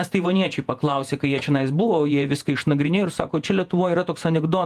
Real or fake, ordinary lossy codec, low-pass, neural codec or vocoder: fake; Opus, 32 kbps; 14.4 kHz; vocoder, 48 kHz, 128 mel bands, Vocos